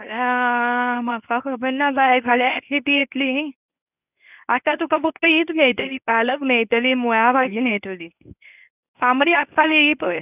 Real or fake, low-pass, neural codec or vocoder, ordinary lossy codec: fake; 3.6 kHz; codec, 24 kHz, 0.9 kbps, WavTokenizer, medium speech release version 1; none